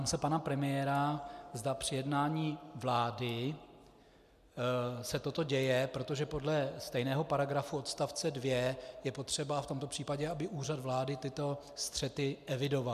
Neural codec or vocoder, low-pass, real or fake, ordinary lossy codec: none; 14.4 kHz; real; MP3, 96 kbps